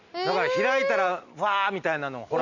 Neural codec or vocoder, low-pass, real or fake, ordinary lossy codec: none; 7.2 kHz; real; none